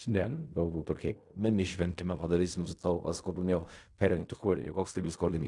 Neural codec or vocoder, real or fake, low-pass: codec, 16 kHz in and 24 kHz out, 0.4 kbps, LongCat-Audio-Codec, fine tuned four codebook decoder; fake; 10.8 kHz